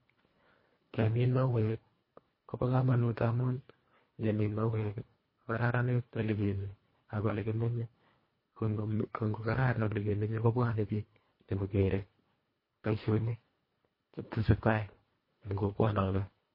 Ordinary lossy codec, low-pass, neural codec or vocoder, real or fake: MP3, 24 kbps; 5.4 kHz; codec, 24 kHz, 1.5 kbps, HILCodec; fake